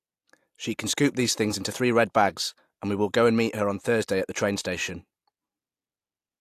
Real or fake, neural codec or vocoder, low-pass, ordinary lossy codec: real; none; 14.4 kHz; AAC, 64 kbps